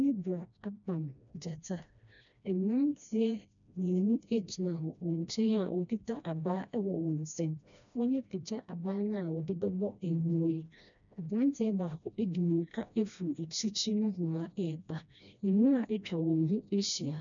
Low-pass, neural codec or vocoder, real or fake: 7.2 kHz; codec, 16 kHz, 1 kbps, FreqCodec, smaller model; fake